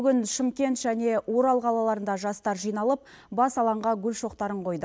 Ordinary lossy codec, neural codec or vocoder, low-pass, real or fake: none; none; none; real